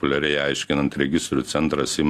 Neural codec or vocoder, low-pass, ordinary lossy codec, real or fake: none; 14.4 kHz; AAC, 64 kbps; real